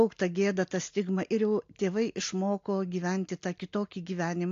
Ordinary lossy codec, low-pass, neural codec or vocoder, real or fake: AAC, 48 kbps; 7.2 kHz; none; real